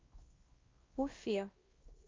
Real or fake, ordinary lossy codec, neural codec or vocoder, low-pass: fake; Opus, 24 kbps; codec, 16 kHz, 1 kbps, X-Codec, WavLM features, trained on Multilingual LibriSpeech; 7.2 kHz